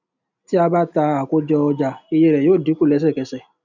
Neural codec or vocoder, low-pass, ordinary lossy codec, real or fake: none; 7.2 kHz; none; real